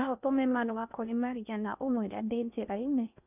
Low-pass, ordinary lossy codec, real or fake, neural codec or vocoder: 3.6 kHz; none; fake; codec, 16 kHz in and 24 kHz out, 0.6 kbps, FocalCodec, streaming, 4096 codes